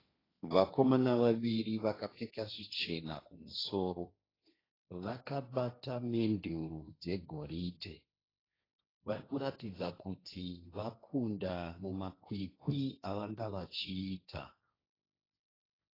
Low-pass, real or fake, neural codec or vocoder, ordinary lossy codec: 5.4 kHz; fake; codec, 16 kHz, 1.1 kbps, Voila-Tokenizer; AAC, 24 kbps